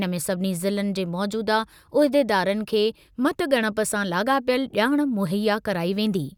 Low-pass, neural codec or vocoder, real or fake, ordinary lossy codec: 19.8 kHz; none; real; none